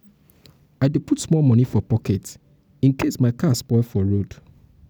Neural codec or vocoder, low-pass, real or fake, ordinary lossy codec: none; none; real; none